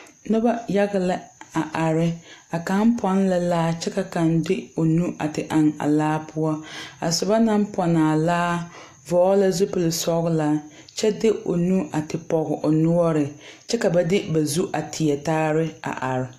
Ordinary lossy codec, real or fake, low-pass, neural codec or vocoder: AAC, 64 kbps; real; 14.4 kHz; none